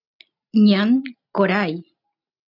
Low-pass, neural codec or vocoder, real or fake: 5.4 kHz; none; real